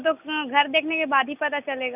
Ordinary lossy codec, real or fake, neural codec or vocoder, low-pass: none; real; none; 3.6 kHz